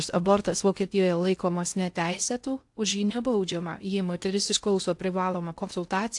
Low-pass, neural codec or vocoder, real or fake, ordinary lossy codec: 10.8 kHz; codec, 16 kHz in and 24 kHz out, 0.6 kbps, FocalCodec, streaming, 2048 codes; fake; AAC, 64 kbps